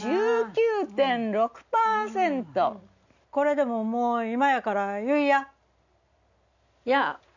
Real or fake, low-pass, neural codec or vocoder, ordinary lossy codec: real; 7.2 kHz; none; none